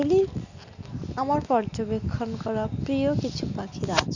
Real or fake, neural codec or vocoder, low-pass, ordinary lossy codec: real; none; 7.2 kHz; none